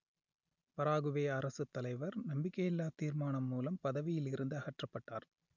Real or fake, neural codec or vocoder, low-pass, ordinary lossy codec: real; none; 7.2 kHz; none